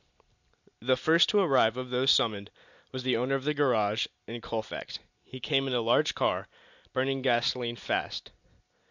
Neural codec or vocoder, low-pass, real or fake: none; 7.2 kHz; real